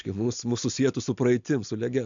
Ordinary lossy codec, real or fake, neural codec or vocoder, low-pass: MP3, 64 kbps; real; none; 7.2 kHz